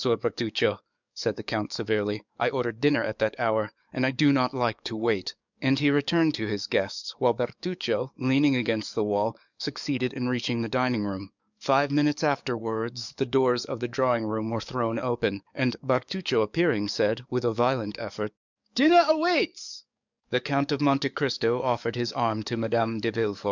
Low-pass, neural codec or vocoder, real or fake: 7.2 kHz; codec, 44.1 kHz, 7.8 kbps, DAC; fake